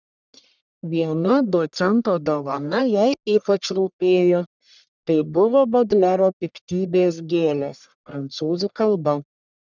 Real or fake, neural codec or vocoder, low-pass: fake; codec, 44.1 kHz, 1.7 kbps, Pupu-Codec; 7.2 kHz